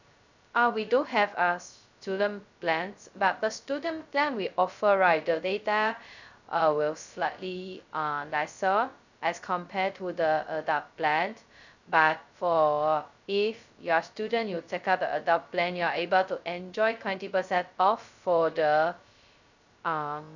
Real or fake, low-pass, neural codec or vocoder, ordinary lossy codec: fake; 7.2 kHz; codec, 16 kHz, 0.2 kbps, FocalCodec; none